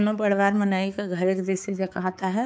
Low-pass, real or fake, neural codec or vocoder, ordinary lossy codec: none; fake; codec, 16 kHz, 4 kbps, X-Codec, HuBERT features, trained on balanced general audio; none